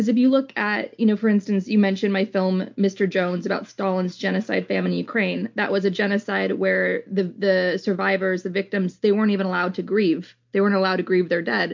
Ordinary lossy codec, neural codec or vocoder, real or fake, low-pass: MP3, 48 kbps; none; real; 7.2 kHz